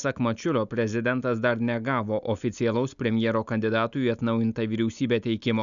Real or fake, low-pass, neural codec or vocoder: real; 7.2 kHz; none